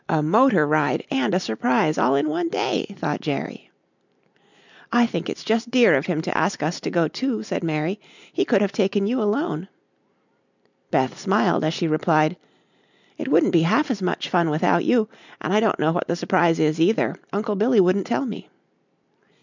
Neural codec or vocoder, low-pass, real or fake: none; 7.2 kHz; real